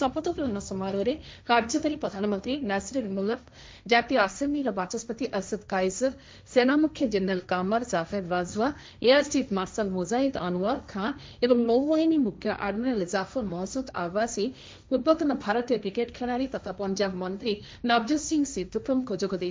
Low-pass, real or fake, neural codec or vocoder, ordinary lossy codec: none; fake; codec, 16 kHz, 1.1 kbps, Voila-Tokenizer; none